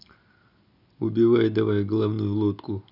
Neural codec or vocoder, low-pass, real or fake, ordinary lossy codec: none; 5.4 kHz; real; none